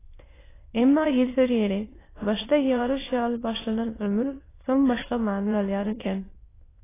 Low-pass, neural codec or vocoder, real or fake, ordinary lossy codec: 3.6 kHz; autoencoder, 22.05 kHz, a latent of 192 numbers a frame, VITS, trained on many speakers; fake; AAC, 16 kbps